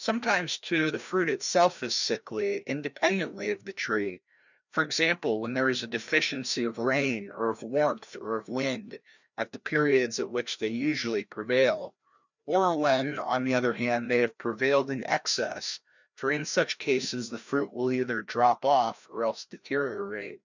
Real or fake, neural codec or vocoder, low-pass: fake; codec, 16 kHz, 1 kbps, FreqCodec, larger model; 7.2 kHz